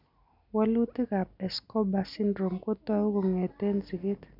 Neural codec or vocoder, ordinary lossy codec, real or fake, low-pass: none; none; real; 5.4 kHz